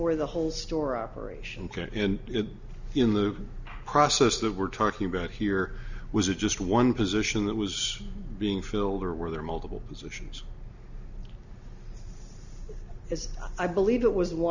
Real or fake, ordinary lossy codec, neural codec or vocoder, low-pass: real; Opus, 64 kbps; none; 7.2 kHz